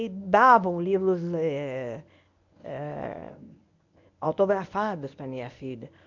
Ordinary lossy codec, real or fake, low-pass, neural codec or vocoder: none; fake; 7.2 kHz; codec, 24 kHz, 0.9 kbps, WavTokenizer, medium speech release version 1